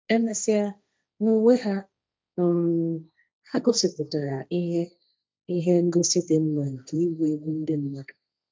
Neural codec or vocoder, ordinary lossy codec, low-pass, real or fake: codec, 16 kHz, 1.1 kbps, Voila-Tokenizer; none; none; fake